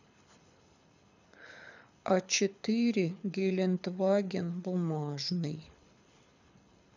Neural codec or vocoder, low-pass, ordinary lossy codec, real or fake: codec, 24 kHz, 6 kbps, HILCodec; 7.2 kHz; none; fake